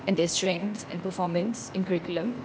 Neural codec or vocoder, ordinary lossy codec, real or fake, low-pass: codec, 16 kHz, 0.8 kbps, ZipCodec; none; fake; none